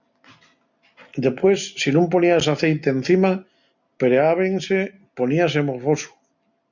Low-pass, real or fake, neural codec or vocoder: 7.2 kHz; real; none